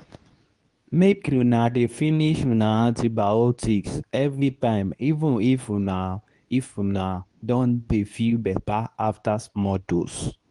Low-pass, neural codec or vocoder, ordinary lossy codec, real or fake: 10.8 kHz; codec, 24 kHz, 0.9 kbps, WavTokenizer, medium speech release version 2; Opus, 32 kbps; fake